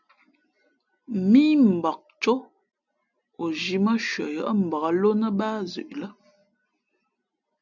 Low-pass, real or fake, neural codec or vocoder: 7.2 kHz; real; none